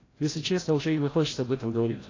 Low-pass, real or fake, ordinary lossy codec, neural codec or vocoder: 7.2 kHz; fake; AAC, 32 kbps; codec, 16 kHz, 0.5 kbps, FreqCodec, larger model